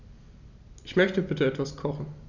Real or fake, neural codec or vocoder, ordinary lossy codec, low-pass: real; none; none; 7.2 kHz